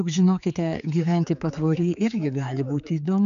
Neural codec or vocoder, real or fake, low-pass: codec, 16 kHz, 4 kbps, X-Codec, HuBERT features, trained on general audio; fake; 7.2 kHz